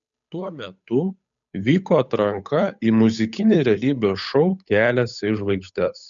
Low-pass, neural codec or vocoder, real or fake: 7.2 kHz; codec, 16 kHz, 8 kbps, FunCodec, trained on Chinese and English, 25 frames a second; fake